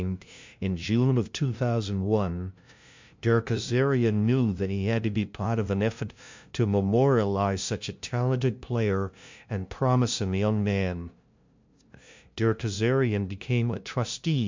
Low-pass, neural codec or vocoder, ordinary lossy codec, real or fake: 7.2 kHz; codec, 16 kHz, 0.5 kbps, FunCodec, trained on LibriTTS, 25 frames a second; MP3, 64 kbps; fake